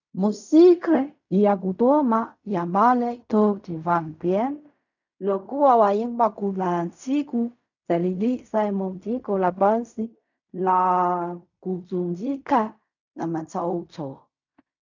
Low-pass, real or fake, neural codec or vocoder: 7.2 kHz; fake; codec, 16 kHz in and 24 kHz out, 0.4 kbps, LongCat-Audio-Codec, fine tuned four codebook decoder